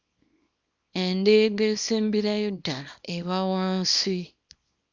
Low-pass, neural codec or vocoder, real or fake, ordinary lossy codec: 7.2 kHz; codec, 24 kHz, 0.9 kbps, WavTokenizer, small release; fake; Opus, 64 kbps